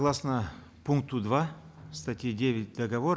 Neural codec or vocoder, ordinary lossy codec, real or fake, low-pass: none; none; real; none